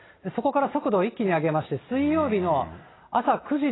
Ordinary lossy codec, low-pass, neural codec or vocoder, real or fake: AAC, 16 kbps; 7.2 kHz; none; real